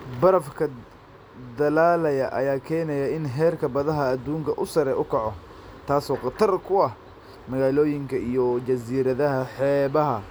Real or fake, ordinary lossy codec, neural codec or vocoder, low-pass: real; none; none; none